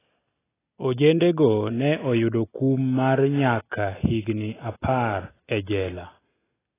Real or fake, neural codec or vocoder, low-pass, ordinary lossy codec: real; none; 3.6 kHz; AAC, 16 kbps